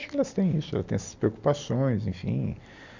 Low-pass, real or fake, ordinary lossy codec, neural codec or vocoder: 7.2 kHz; real; Opus, 64 kbps; none